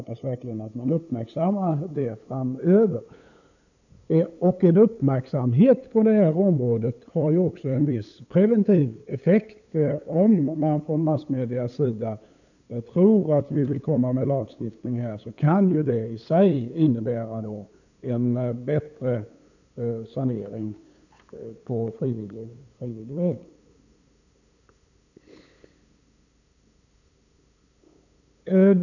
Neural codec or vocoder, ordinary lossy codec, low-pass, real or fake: codec, 16 kHz, 8 kbps, FunCodec, trained on LibriTTS, 25 frames a second; none; 7.2 kHz; fake